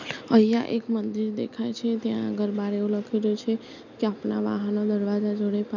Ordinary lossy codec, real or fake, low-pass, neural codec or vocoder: none; real; 7.2 kHz; none